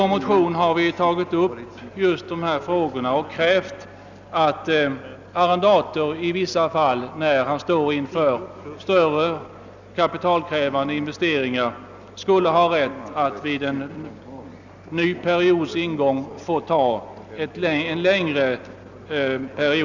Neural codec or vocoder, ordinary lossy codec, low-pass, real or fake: none; none; 7.2 kHz; real